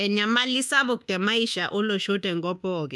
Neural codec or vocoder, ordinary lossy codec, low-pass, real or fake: codec, 24 kHz, 1.2 kbps, DualCodec; none; none; fake